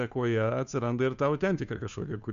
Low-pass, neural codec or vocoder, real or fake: 7.2 kHz; codec, 16 kHz, 2 kbps, FunCodec, trained on Chinese and English, 25 frames a second; fake